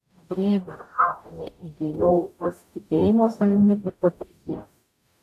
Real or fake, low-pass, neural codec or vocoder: fake; 14.4 kHz; codec, 44.1 kHz, 0.9 kbps, DAC